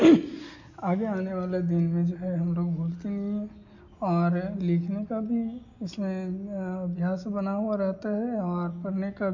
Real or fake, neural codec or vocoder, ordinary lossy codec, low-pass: real; none; none; 7.2 kHz